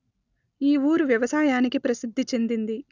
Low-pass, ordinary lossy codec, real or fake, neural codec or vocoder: 7.2 kHz; none; real; none